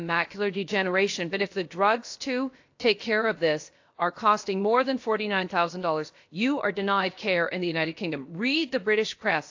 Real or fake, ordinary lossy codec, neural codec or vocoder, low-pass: fake; AAC, 48 kbps; codec, 16 kHz, about 1 kbps, DyCAST, with the encoder's durations; 7.2 kHz